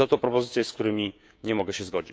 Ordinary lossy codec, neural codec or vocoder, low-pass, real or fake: none; codec, 16 kHz, 6 kbps, DAC; none; fake